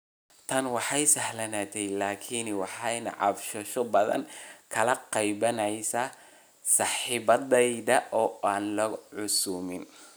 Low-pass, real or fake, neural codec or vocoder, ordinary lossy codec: none; real; none; none